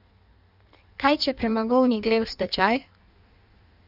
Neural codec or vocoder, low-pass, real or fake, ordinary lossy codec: codec, 16 kHz in and 24 kHz out, 1.1 kbps, FireRedTTS-2 codec; 5.4 kHz; fake; none